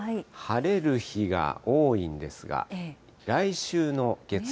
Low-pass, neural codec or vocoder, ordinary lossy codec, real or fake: none; none; none; real